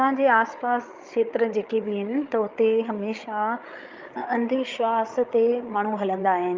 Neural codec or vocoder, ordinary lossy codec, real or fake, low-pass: codec, 16 kHz, 8 kbps, FreqCodec, larger model; Opus, 24 kbps; fake; 7.2 kHz